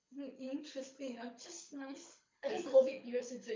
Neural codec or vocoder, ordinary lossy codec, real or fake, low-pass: codec, 24 kHz, 6 kbps, HILCodec; MP3, 48 kbps; fake; 7.2 kHz